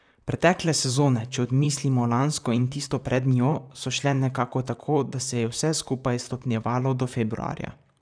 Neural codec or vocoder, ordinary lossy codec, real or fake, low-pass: vocoder, 44.1 kHz, 128 mel bands, Pupu-Vocoder; none; fake; 9.9 kHz